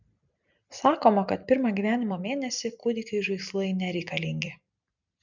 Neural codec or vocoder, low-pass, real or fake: none; 7.2 kHz; real